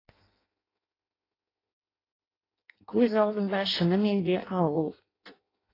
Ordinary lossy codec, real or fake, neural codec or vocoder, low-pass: AAC, 24 kbps; fake; codec, 16 kHz in and 24 kHz out, 0.6 kbps, FireRedTTS-2 codec; 5.4 kHz